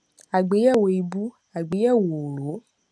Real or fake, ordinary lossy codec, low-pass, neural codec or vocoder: real; none; none; none